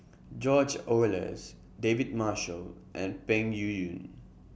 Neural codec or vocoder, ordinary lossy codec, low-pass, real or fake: none; none; none; real